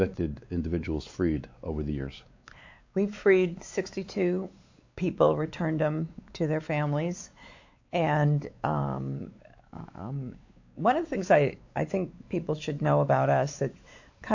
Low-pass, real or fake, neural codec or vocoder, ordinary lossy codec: 7.2 kHz; fake; codec, 16 kHz, 4 kbps, X-Codec, WavLM features, trained on Multilingual LibriSpeech; AAC, 48 kbps